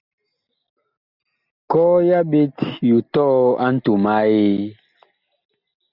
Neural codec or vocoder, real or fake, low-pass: none; real; 5.4 kHz